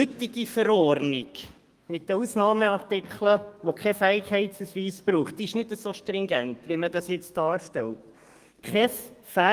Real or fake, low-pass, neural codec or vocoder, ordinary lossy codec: fake; 14.4 kHz; codec, 32 kHz, 1.9 kbps, SNAC; Opus, 32 kbps